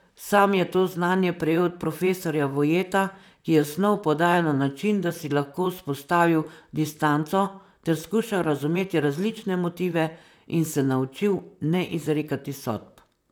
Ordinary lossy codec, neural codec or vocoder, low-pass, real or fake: none; vocoder, 44.1 kHz, 128 mel bands, Pupu-Vocoder; none; fake